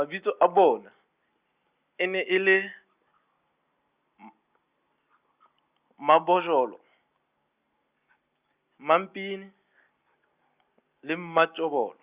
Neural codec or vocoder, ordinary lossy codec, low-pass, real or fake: none; Opus, 64 kbps; 3.6 kHz; real